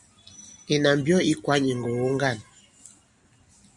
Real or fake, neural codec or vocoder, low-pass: real; none; 10.8 kHz